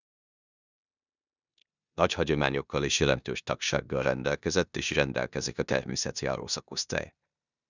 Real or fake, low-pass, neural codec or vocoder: fake; 7.2 kHz; codec, 16 kHz in and 24 kHz out, 0.9 kbps, LongCat-Audio-Codec, four codebook decoder